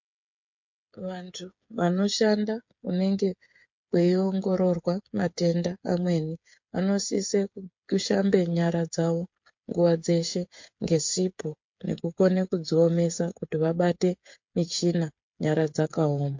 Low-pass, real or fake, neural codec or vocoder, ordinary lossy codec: 7.2 kHz; fake; codec, 16 kHz, 8 kbps, FreqCodec, smaller model; MP3, 48 kbps